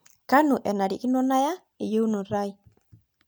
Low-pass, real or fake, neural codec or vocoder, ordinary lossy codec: none; real; none; none